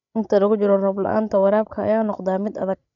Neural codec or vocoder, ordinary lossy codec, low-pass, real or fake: codec, 16 kHz, 16 kbps, FreqCodec, larger model; none; 7.2 kHz; fake